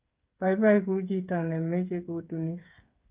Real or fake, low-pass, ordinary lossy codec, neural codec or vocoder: fake; 3.6 kHz; Opus, 32 kbps; codec, 16 kHz, 8 kbps, FreqCodec, smaller model